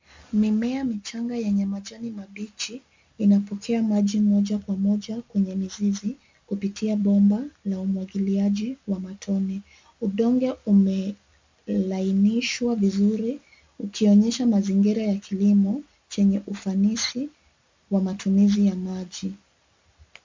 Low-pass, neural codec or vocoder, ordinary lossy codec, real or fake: 7.2 kHz; none; MP3, 64 kbps; real